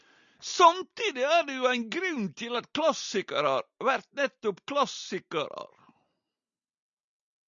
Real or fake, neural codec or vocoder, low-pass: real; none; 7.2 kHz